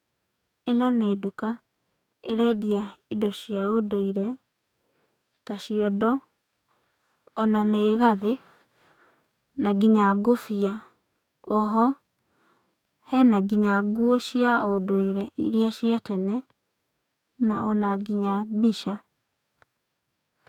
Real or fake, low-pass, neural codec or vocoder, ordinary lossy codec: fake; 19.8 kHz; codec, 44.1 kHz, 2.6 kbps, DAC; none